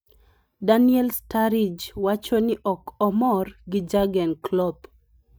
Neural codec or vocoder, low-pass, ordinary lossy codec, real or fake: none; none; none; real